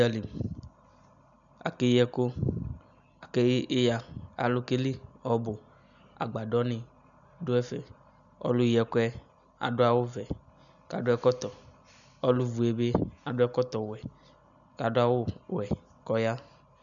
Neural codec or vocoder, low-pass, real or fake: none; 7.2 kHz; real